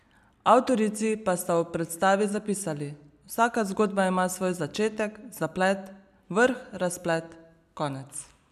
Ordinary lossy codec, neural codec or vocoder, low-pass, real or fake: none; none; 14.4 kHz; real